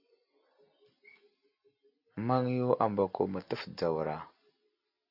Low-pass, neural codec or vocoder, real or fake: 5.4 kHz; none; real